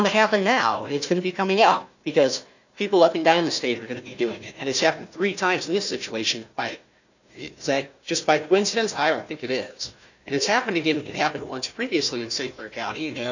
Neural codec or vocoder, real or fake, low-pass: codec, 16 kHz, 1 kbps, FunCodec, trained on Chinese and English, 50 frames a second; fake; 7.2 kHz